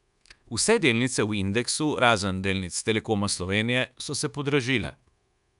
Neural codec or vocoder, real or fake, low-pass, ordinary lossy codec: codec, 24 kHz, 1.2 kbps, DualCodec; fake; 10.8 kHz; none